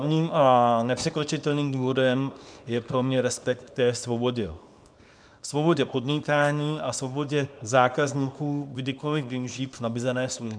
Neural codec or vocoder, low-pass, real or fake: codec, 24 kHz, 0.9 kbps, WavTokenizer, small release; 9.9 kHz; fake